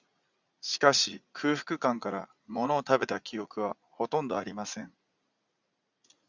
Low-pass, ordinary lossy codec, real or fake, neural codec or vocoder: 7.2 kHz; Opus, 64 kbps; fake; vocoder, 44.1 kHz, 80 mel bands, Vocos